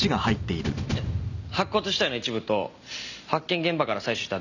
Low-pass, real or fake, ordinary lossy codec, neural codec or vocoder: 7.2 kHz; real; none; none